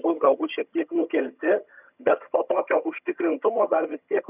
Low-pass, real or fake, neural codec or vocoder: 3.6 kHz; fake; vocoder, 22.05 kHz, 80 mel bands, HiFi-GAN